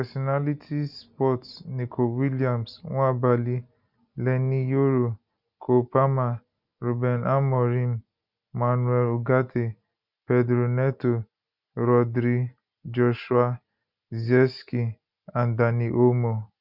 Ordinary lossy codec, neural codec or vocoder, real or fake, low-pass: none; none; real; 5.4 kHz